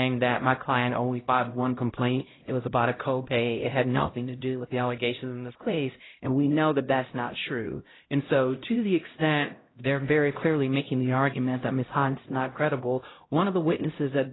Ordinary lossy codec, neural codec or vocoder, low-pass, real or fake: AAC, 16 kbps; codec, 16 kHz, 0.5 kbps, X-Codec, HuBERT features, trained on LibriSpeech; 7.2 kHz; fake